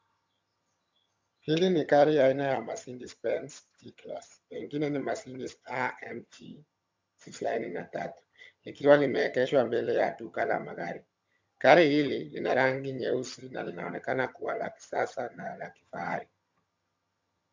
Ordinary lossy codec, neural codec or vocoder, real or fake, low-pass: MP3, 64 kbps; vocoder, 22.05 kHz, 80 mel bands, HiFi-GAN; fake; 7.2 kHz